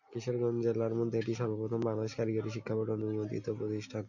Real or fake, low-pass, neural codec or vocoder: real; 7.2 kHz; none